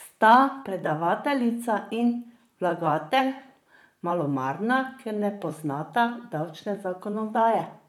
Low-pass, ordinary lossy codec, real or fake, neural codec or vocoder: 19.8 kHz; none; fake; vocoder, 44.1 kHz, 128 mel bands, Pupu-Vocoder